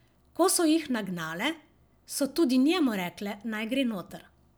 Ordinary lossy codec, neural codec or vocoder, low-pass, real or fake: none; none; none; real